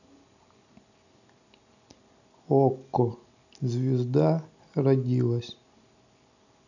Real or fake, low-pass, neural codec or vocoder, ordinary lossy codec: real; 7.2 kHz; none; none